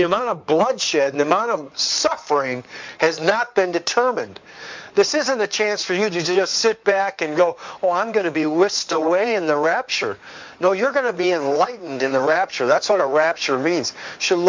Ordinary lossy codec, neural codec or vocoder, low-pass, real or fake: MP3, 64 kbps; codec, 16 kHz in and 24 kHz out, 2.2 kbps, FireRedTTS-2 codec; 7.2 kHz; fake